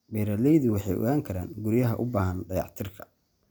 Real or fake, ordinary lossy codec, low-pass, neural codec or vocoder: real; none; none; none